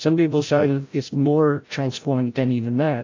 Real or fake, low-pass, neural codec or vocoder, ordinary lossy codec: fake; 7.2 kHz; codec, 16 kHz, 0.5 kbps, FreqCodec, larger model; AAC, 48 kbps